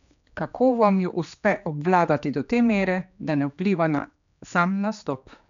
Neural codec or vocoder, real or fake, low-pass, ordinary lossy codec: codec, 16 kHz, 2 kbps, X-Codec, HuBERT features, trained on general audio; fake; 7.2 kHz; none